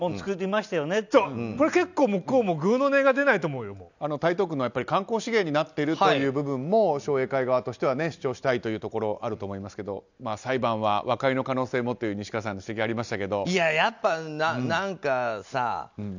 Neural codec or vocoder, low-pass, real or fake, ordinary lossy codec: none; 7.2 kHz; real; none